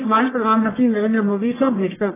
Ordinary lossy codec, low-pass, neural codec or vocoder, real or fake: AAC, 16 kbps; 3.6 kHz; codec, 44.1 kHz, 1.7 kbps, Pupu-Codec; fake